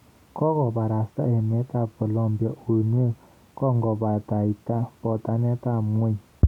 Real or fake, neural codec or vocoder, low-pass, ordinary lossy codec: real; none; 19.8 kHz; none